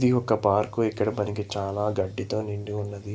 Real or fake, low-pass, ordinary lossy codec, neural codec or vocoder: real; none; none; none